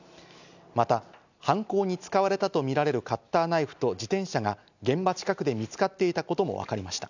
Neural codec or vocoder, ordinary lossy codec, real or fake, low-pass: none; none; real; 7.2 kHz